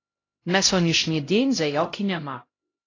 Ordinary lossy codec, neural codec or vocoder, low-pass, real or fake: AAC, 32 kbps; codec, 16 kHz, 0.5 kbps, X-Codec, HuBERT features, trained on LibriSpeech; 7.2 kHz; fake